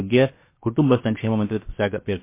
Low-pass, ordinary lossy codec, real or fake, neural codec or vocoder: 3.6 kHz; MP3, 24 kbps; fake; codec, 16 kHz, about 1 kbps, DyCAST, with the encoder's durations